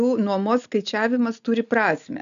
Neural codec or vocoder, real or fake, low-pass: none; real; 7.2 kHz